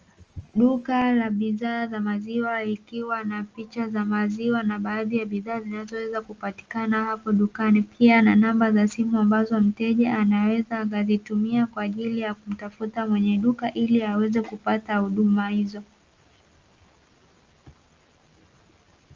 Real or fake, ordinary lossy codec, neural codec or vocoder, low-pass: real; Opus, 24 kbps; none; 7.2 kHz